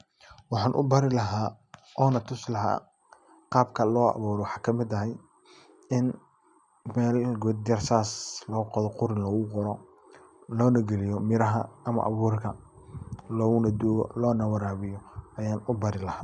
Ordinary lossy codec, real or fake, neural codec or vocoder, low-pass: none; real; none; 10.8 kHz